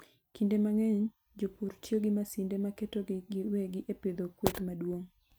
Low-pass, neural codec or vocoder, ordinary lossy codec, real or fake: none; none; none; real